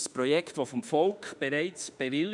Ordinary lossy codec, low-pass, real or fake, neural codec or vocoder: none; 10.8 kHz; fake; autoencoder, 48 kHz, 32 numbers a frame, DAC-VAE, trained on Japanese speech